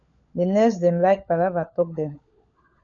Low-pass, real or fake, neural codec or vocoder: 7.2 kHz; fake; codec, 16 kHz, 16 kbps, FunCodec, trained on LibriTTS, 50 frames a second